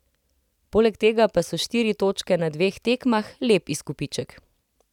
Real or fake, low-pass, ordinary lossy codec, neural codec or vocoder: real; 19.8 kHz; none; none